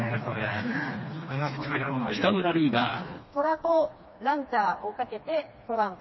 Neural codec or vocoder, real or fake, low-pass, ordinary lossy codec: codec, 16 kHz, 2 kbps, FreqCodec, smaller model; fake; 7.2 kHz; MP3, 24 kbps